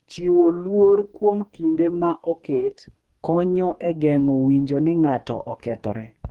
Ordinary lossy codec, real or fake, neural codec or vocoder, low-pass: Opus, 24 kbps; fake; codec, 44.1 kHz, 2.6 kbps, DAC; 19.8 kHz